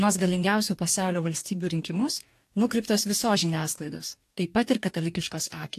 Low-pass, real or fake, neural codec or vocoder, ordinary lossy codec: 14.4 kHz; fake; codec, 44.1 kHz, 2.6 kbps, DAC; AAC, 64 kbps